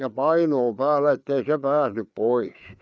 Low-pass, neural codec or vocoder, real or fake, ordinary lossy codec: none; codec, 16 kHz, 4 kbps, FreqCodec, larger model; fake; none